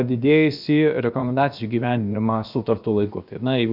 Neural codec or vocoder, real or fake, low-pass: codec, 16 kHz, about 1 kbps, DyCAST, with the encoder's durations; fake; 5.4 kHz